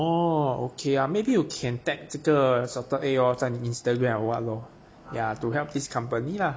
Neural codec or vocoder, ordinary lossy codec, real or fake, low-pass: none; none; real; none